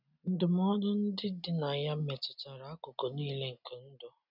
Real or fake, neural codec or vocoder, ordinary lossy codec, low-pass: real; none; none; 5.4 kHz